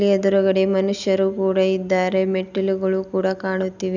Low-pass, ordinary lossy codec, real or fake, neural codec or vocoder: 7.2 kHz; none; real; none